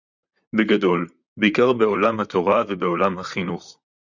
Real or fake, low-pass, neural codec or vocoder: fake; 7.2 kHz; vocoder, 44.1 kHz, 128 mel bands, Pupu-Vocoder